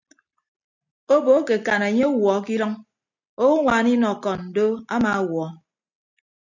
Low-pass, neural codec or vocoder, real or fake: 7.2 kHz; none; real